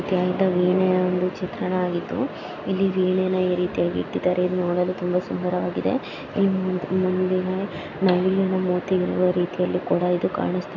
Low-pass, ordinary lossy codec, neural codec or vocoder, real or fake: 7.2 kHz; none; none; real